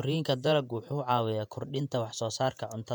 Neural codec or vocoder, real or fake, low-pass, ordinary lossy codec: vocoder, 48 kHz, 128 mel bands, Vocos; fake; 19.8 kHz; none